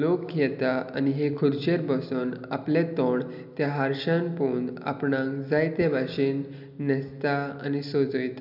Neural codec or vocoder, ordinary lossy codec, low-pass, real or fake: none; none; 5.4 kHz; real